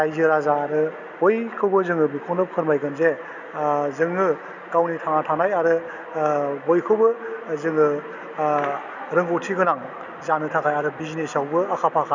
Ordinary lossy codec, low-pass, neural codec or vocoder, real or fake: none; 7.2 kHz; none; real